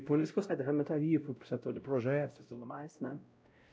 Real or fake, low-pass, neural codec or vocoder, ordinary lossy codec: fake; none; codec, 16 kHz, 0.5 kbps, X-Codec, WavLM features, trained on Multilingual LibriSpeech; none